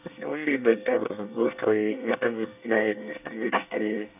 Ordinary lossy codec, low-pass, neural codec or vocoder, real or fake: none; 3.6 kHz; codec, 24 kHz, 1 kbps, SNAC; fake